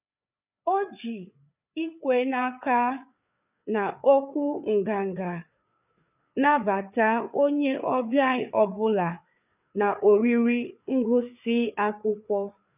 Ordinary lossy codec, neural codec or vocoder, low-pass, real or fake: none; codec, 16 kHz, 4 kbps, FreqCodec, larger model; 3.6 kHz; fake